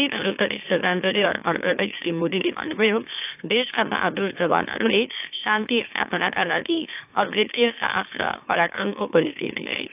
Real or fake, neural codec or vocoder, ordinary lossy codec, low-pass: fake; autoencoder, 44.1 kHz, a latent of 192 numbers a frame, MeloTTS; AAC, 32 kbps; 3.6 kHz